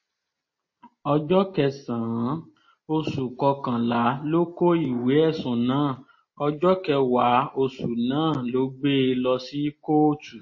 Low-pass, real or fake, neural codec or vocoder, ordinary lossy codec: 7.2 kHz; real; none; MP3, 32 kbps